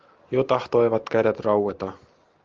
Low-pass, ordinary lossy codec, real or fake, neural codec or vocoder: 7.2 kHz; Opus, 32 kbps; real; none